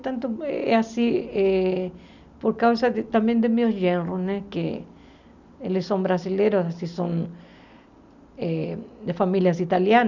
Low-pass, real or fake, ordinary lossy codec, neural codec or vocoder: 7.2 kHz; real; none; none